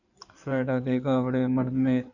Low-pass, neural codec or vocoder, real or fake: 7.2 kHz; codec, 16 kHz in and 24 kHz out, 2.2 kbps, FireRedTTS-2 codec; fake